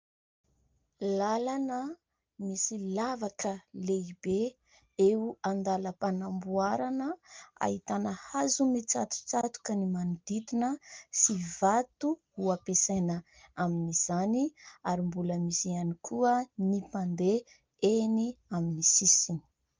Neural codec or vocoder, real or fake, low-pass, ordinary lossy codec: none; real; 7.2 kHz; Opus, 24 kbps